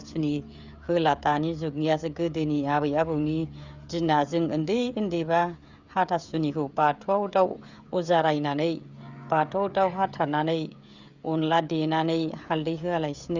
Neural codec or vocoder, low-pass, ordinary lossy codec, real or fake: codec, 16 kHz, 16 kbps, FreqCodec, smaller model; 7.2 kHz; Opus, 64 kbps; fake